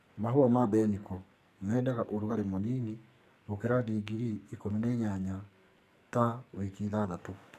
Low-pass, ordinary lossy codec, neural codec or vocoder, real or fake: 14.4 kHz; none; codec, 44.1 kHz, 2.6 kbps, SNAC; fake